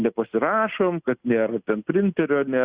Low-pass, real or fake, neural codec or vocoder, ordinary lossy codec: 3.6 kHz; real; none; Opus, 64 kbps